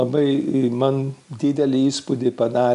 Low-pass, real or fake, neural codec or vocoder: 10.8 kHz; real; none